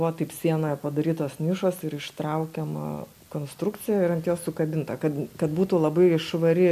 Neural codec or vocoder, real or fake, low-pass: none; real; 14.4 kHz